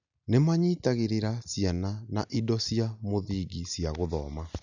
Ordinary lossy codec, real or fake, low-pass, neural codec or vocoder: none; real; 7.2 kHz; none